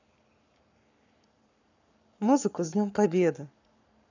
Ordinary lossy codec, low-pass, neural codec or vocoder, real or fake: none; 7.2 kHz; codec, 44.1 kHz, 7.8 kbps, Pupu-Codec; fake